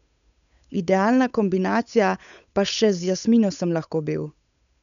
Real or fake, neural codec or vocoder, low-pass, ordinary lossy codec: fake; codec, 16 kHz, 8 kbps, FunCodec, trained on Chinese and English, 25 frames a second; 7.2 kHz; none